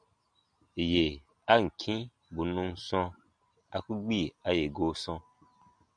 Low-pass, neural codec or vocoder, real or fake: 9.9 kHz; none; real